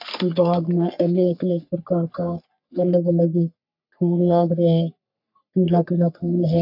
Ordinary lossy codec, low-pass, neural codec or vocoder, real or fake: none; 5.4 kHz; codec, 44.1 kHz, 3.4 kbps, Pupu-Codec; fake